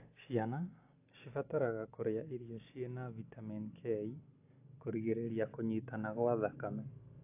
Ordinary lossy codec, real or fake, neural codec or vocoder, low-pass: AAC, 24 kbps; real; none; 3.6 kHz